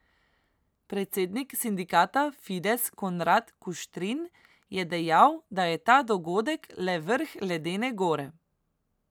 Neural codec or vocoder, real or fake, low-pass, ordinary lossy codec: none; real; none; none